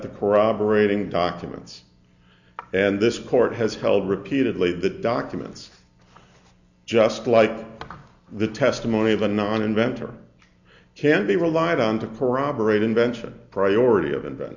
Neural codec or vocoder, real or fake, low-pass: none; real; 7.2 kHz